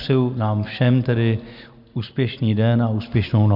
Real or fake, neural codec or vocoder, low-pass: real; none; 5.4 kHz